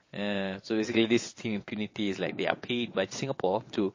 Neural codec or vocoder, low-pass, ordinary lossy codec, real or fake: vocoder, 22.05 kHz, 80 mel bands, WaveNeXt; 7.2 kHz; MP3, 32 kbps; fake